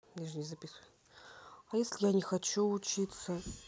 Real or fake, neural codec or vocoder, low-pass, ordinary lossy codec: real; none; none; none